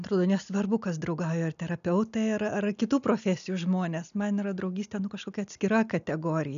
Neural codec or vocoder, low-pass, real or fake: none; 7.2 kHz; real